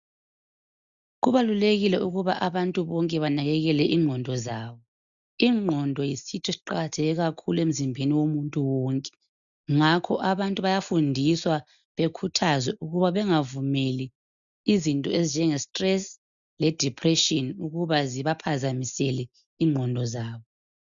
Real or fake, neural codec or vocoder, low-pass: real; none; 7.2 kHz